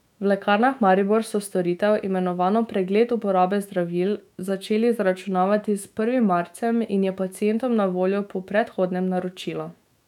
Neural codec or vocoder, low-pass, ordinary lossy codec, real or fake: autoencoder, 48 kHz, 128 numbers a frame, DAC-VAE, trained on Japanese speech; 19.8 kHz; none; fake